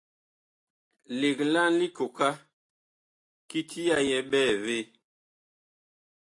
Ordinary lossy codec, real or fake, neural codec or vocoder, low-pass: AAC, 48 kbps; real; none; 10.8 kHz